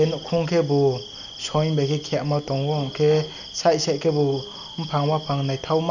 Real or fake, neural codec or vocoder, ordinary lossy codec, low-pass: real; none; none; 7.2 kHz